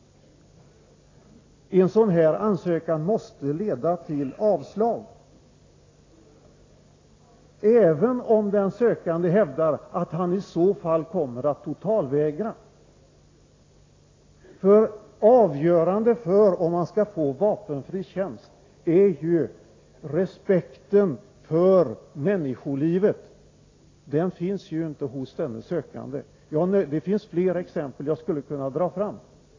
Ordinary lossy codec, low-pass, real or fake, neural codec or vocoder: AAC, 32 kbps; 7.2 kHz; real; none